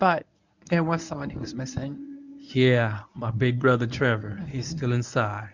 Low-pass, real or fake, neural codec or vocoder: 7.2 kHz; fake; codec, 24 kHz, 0.9 kbps, WavTokenizer, medium speech release version 1